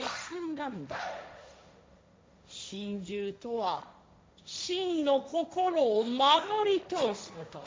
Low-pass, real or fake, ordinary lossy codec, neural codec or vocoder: none; fake; none; codec, 16 kHz, 1.1 kbps, Voila-Tokenizer